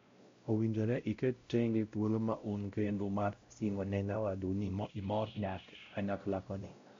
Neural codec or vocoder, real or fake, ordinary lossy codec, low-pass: codec, 16 kHz, 0.5 kbps, X-Codec, WavLM features, trained on Multilingual LibriSpeech; fake; MP3, 48 kbps; 7.2 kHz